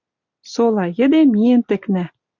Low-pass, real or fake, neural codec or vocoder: 7.2 kHz; real; none